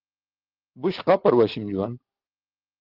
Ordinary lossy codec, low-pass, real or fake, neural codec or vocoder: Opus, 16 kbps; 5.4 kHz; real; none